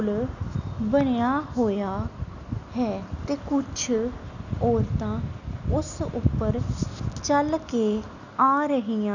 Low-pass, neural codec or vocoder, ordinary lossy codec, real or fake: 7.2 kHz; none; none; real